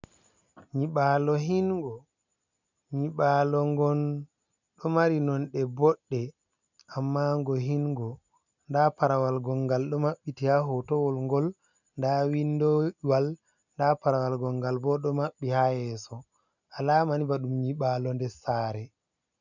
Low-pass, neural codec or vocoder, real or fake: 7.2 kHz; none; real